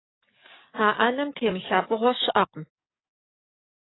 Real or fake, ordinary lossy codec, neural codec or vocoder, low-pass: fake; AAC, 16 kbps; codec, 44.1 kHz, 7.8 kbps, DAC; 7.2 kHz